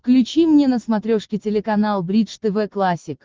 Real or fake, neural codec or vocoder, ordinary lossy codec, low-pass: real; none; Opus, 16 kbps; 7.2 kHz